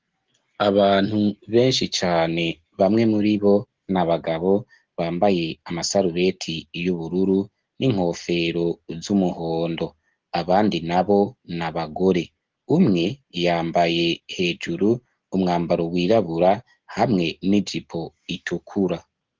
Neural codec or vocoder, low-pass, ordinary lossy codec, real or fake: none; 7.2 kHz; Opus, 16 kbps; real